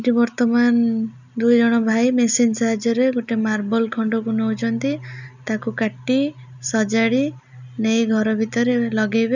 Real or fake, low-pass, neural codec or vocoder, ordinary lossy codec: real; 7.2 kHz; none; none